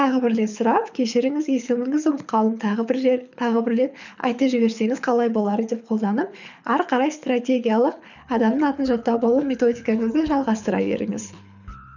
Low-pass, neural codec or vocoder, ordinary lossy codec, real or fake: 7.2 kHz; codec, 24 kHz, 6 kbps, HILCodec; none; fake